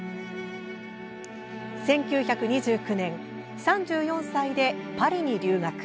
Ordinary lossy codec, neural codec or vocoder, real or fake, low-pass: none; none; real; none